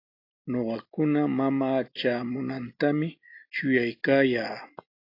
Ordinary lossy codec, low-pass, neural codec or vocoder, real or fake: AAC, 32 kbps; 5.4 kHz; none; real